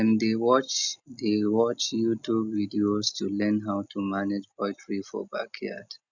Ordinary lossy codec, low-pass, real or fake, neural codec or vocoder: none; 7.2 kHz; real; none